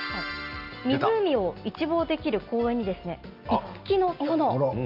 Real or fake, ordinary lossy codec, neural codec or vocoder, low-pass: real; Opus, 24 kbps; none; 5.4 kHz